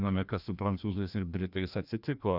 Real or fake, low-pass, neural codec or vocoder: fake; 5.4 kHz; codec, 16 kHz, 1 kbps, FreqCodec, larger model